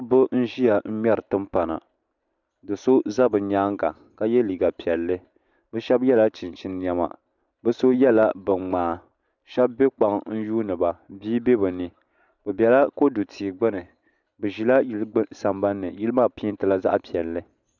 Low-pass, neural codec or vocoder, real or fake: 7.2 kHz; none; real